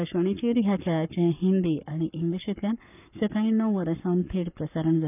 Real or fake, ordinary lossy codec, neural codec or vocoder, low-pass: fake; none; codec, 44.1 kHz, 7.8 kbps, Pupu-Codec; 3.6 kHz